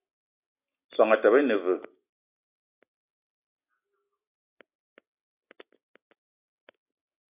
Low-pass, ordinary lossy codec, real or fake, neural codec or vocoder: 3.6 kHz; AAC, 32 kbps; real; none